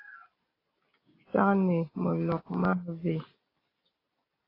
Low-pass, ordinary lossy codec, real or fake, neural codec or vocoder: 5.4 kHz; AAC, 24 kbps; real; none